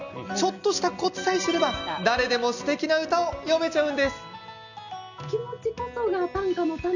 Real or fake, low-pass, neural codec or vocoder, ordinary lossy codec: real; 7.2 kHz; none; none